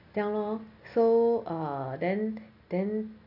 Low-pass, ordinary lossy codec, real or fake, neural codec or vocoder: 5.4 kHz; AAC, 48 kbps; real; none